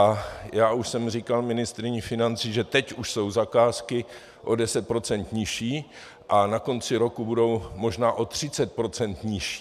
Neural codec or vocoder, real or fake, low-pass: none; real; 14.4 kHz